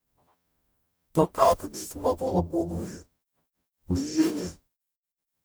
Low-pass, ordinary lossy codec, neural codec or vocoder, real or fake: none; none; codec, 44.1 kHz, 0.9 kbps, DAC; fake